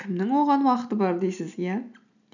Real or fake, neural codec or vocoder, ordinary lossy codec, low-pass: real; none; none; 7.2 kHz